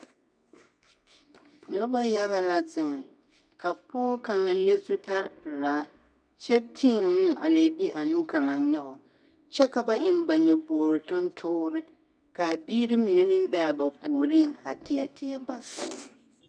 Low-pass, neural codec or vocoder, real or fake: 9.9 kHz; codec, 24 kHz, 0.9 kbps, WavTokenizer, medium music audio release; fake